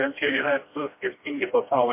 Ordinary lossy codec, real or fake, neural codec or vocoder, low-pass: MP3, 24 kbps; fake; codec, 16 kHz, 1 kbps, FreqCodec, smaller model; 3.6 kHz